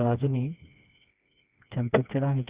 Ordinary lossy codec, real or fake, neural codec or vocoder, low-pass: Opus, 64 kbps; fake; codec, 16 kHz, 2 kbps, FreqCodec, smaller model; 3.6 kHz